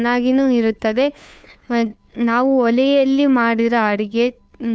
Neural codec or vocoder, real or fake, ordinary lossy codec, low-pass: codec, 16 kHz, 2 kbps, FunCodec, trained on Chinese and English, 25 frames a second; fake; none; none